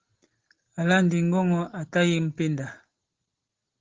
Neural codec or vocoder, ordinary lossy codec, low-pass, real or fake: none; Opus, 16 kbps; 7.2 kHz; real